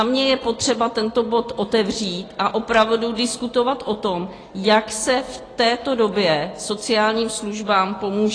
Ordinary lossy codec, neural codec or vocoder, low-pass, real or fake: AAC, 32 kbps; none; 9.9 kHz; real